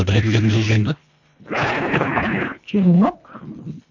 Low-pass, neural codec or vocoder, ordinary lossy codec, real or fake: 7.2 kHz; codec, 24 kHz, 1.5 kbps, HILCodec; none; fake